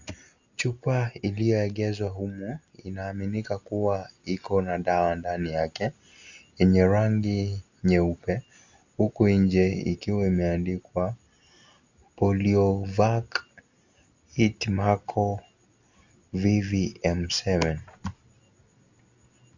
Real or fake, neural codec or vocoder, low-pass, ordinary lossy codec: real; none; 7.2 kHz; Opus, 64 kbps